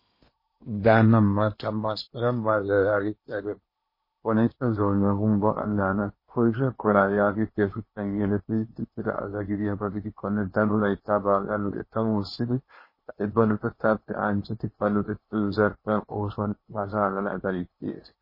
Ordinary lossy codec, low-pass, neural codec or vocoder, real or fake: MP3, 24 kbps; 5.4 kHz; codec, 16 kHz in and 24 kHz out, 0.8 kbps, FocalCodec, streaming, 65536 codes; fake